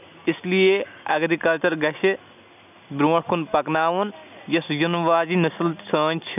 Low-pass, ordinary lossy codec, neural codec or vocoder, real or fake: 3.6 kHz; none; none; real